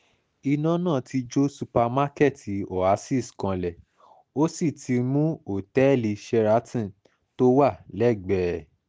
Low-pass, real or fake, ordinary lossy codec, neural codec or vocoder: none; real; none; none